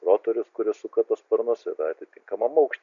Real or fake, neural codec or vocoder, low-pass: real; none; 7.2 kHz